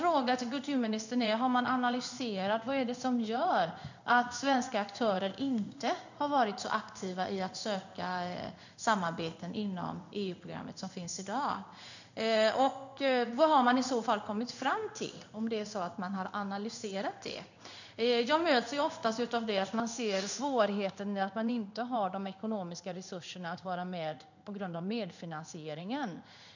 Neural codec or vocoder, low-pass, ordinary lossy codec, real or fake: codec, 16 kHz in and 24 kHz out, 1 kbps, XY-Tokenizer; 7.2 kHz; none; fake